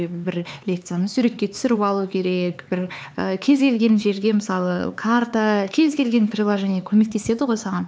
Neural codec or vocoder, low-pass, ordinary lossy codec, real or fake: codec, 16 kHz, 4 kbps, X-Codec, HuBERT features, trained on LibriSpeech; none; none; fake